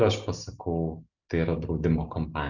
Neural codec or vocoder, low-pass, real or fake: none; 7.2 kHz; real